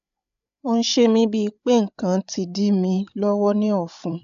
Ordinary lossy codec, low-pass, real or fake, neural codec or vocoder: AAC, 96 kbps; 7.2 kHz; fake; codec, 16 kHz, 8 kbps, FreqCodec, larger model